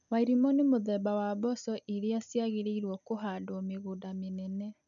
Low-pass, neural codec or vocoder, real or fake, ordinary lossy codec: 7.2 kHz; none; real; none